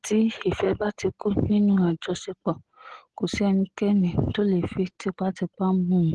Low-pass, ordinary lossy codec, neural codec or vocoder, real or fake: 10.8 kHz; Opus, 16 kbps; none; real